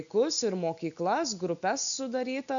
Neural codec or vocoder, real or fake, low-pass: none; real; 7.2 kHz